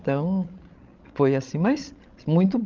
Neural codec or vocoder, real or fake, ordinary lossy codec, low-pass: codec, 16 kHz, 16 kbps, FreqCodec, larger model; fake; Opus, 24 kbps; 7.2 kHz